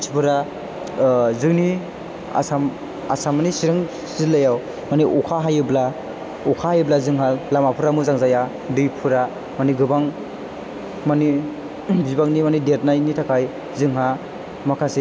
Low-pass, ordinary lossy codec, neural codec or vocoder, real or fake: none; none; none; real